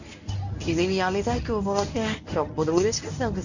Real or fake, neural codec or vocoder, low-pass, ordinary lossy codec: fake; codec, 24 kHz, 0.9 kbps, WavTokenizer, medium speech release version 1; 7.2 kHz; none